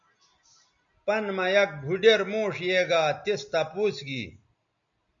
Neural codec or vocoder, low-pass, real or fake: none; 7.2 kHz; real